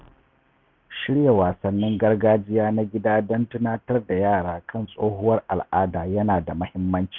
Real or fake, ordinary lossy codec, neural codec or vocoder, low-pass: real; none; none; 7.2 kHz